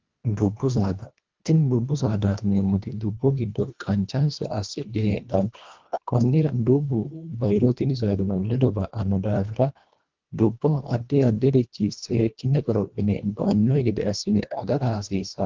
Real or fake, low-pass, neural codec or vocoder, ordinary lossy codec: fake; 7.2 kHz; codec, 24 kHz, 1.5 kbps, HILCodec; Opus, 32 kbps